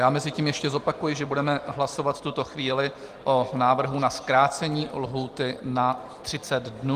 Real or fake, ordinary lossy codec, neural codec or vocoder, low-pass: real; Opus, 24 kbps; none; 14.4 kHz